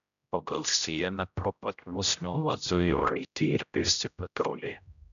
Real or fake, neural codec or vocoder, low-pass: fake; codec, 16 kHz, 0.5 kbps, X-Codec, HuBERT features, trained on general audio; 7.2 kHz